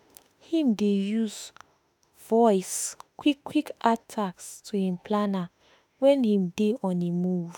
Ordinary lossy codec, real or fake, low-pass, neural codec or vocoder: none; fake; none; autoencoder, 48 kHz, 32 numbers a frame, DAC-VAE, trained on Japanese speech